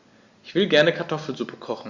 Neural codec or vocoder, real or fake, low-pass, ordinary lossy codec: none; real; 7.2 kHz; Opus, 64 kbps